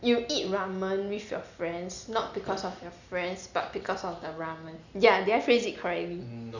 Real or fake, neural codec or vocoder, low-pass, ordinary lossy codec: real; none; 7.2 kHz; none